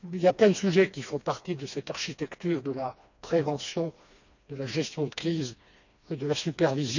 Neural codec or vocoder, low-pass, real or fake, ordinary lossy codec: codec, 16 kHz, 2 kbps, FreqCodec, smaller model; 7.2 kHz; fake; none